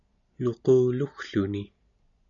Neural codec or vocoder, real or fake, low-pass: none; real; 7.2 kHz